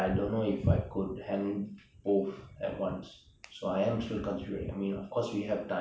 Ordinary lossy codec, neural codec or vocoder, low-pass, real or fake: none; none; none; real